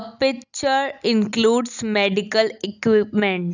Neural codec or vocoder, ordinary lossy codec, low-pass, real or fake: none; none; 7.2 kHz; real